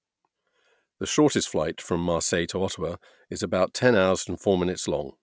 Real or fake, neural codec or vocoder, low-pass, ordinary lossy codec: real; none; none; none